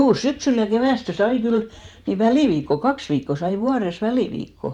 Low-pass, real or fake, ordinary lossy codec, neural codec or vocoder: 19.8 kHz; fake; none; vocoder, 44.1 kHz, 128 mel bands every 512 samples, BigVGAN v2